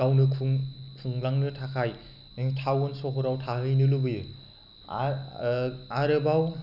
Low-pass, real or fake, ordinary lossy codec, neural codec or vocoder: 5.4 kHz; real; none; none